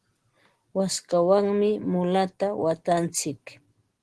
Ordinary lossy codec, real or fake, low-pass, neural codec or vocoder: Opus, 16 kbps; real; 10.8 kHz; none